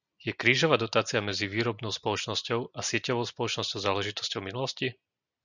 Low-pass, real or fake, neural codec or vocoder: 7.2 kHz; real; none